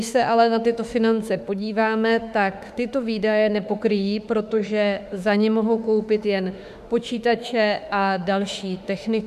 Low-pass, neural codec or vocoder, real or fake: 14.4 kHz; autoencoder, 48 kHz, 32 numbers a frame, DAC-VAE, trained on Japanese speech; fake